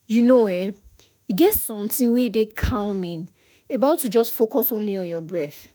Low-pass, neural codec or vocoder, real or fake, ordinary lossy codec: none; autoencoder, 48 kHz, 32 numbers a frame, DAC-VAE, trained on Japanese speech; fake; none